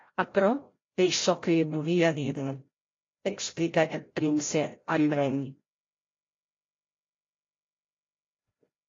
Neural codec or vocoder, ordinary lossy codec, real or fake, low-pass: codec, 16 kHz, 0.5 kbps, FreqCodec, larger model; AAC, 32 kbps; fake; 7.2 kHz